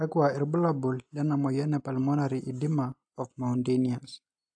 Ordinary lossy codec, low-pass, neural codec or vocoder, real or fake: AAC, 64 kbps; 9.9 kHz; none; real